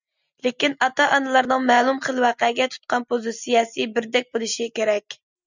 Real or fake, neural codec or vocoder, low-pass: real; none; 7.2 kHz